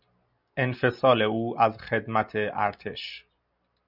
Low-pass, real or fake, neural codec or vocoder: 5.4 kHz; real; none